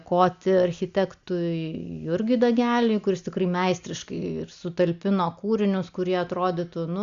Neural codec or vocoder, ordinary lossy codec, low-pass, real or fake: none; Opus, 64 kbps; 7.2 kHz; real